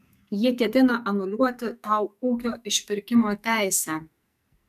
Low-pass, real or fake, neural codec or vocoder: 14.4 kHz; fake; codec, 44.1 kHz, 2.6 kbps, SNAC